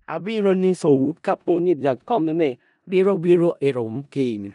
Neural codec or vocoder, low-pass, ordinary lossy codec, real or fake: codec, 16 kHz in and 24 kHz out, 0.4 kbps, LongCat-Audio-Codec, four codebook decoder; 10.8 kHz; none; fake